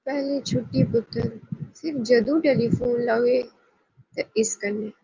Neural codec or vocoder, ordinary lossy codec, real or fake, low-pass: none; Opus, 24 kbps; real; 7.2 kHz